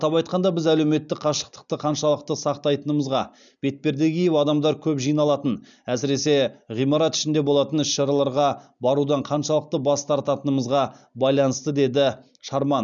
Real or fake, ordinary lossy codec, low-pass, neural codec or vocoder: real; none; 7.2 kHz; none